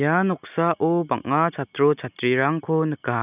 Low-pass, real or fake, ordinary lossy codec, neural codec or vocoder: 3.6 kHz; real; none; none